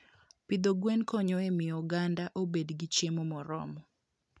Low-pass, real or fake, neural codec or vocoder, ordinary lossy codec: none; real; none; none